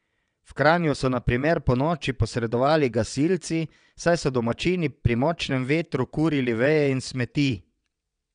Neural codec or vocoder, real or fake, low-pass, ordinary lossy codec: vocoder, 22.05 kHz, 80 mel bands, WaveNeXt; fake; 9.9 kHz; none